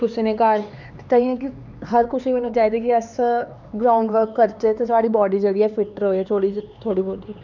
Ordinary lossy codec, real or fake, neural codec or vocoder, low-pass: none; fake; codec, 16 kHz, 4 kbps, X-Codec, HuBERT features, trained on LibriSpeech; 7.2 kHz